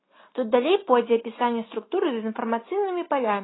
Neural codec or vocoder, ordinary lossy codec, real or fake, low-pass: none; AAC, 16 kbps; real; 7.2 kHz